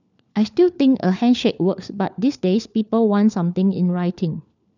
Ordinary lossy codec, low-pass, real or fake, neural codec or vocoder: none; 7.2 kHz; fake; codec, 16 kHz, 4 kbps, FunCodec, trained on LibriTTS, 50 frames a second